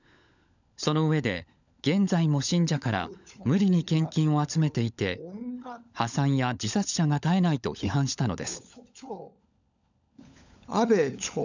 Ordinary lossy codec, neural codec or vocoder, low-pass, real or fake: none; codec, 16 kHz, 16 kbps, FunCodec, trained on LibriTTS, 50 frames a second; 7.2 kHz; fake